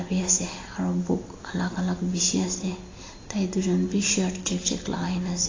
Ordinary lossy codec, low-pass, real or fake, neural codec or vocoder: AAC, 32 kbps; 7.2 kHz; real; none